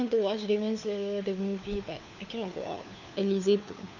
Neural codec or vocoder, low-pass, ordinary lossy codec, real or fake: codec, 16 kHz, 4 kbps, FunCodec, trained on LibriTTS, 50 frames a second; 7.2 kHz; none; fake